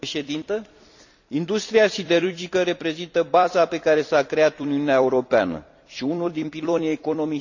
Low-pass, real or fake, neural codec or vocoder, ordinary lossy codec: 7.2 kHz; fake; vocoder, 44.1 kHz, 128 mel bands every 256 samples, BigVGAN v2; none